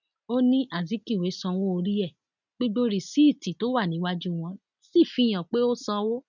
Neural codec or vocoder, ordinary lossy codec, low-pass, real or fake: none; none; 7.2 kHz; real